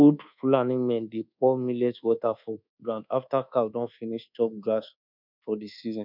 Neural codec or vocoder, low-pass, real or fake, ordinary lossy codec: codec, 24 kHz, 1.2 kbps, DualCodec; 5.4 kHz; fake; none